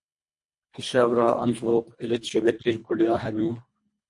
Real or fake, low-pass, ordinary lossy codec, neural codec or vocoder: fake; 10.8 kHz; MP3, 48 kbps; codec, 24 kHz, 1.5 kbps, HILCodec